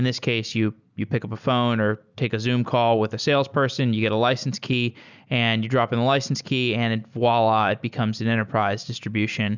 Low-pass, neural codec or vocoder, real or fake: 7.2 kHz; none; real